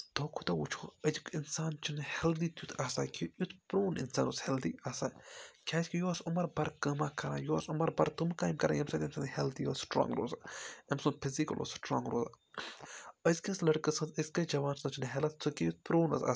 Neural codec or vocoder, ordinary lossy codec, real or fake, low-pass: none; none; real; none